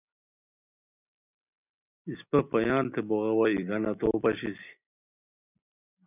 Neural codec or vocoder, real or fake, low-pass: none; real; 3.6 kHz